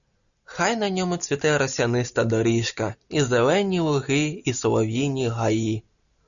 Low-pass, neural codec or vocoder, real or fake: 7.2 kHz; none; real